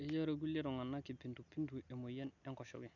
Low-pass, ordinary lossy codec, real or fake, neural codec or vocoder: 7.2 kHz; none; real; none